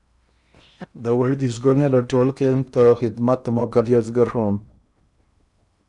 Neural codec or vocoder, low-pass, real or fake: codec, 16 kHz in and 24 kHz out, 0.8 kbps, FocalCodec, streaming, 65536 codes; 10.8 kHz; fake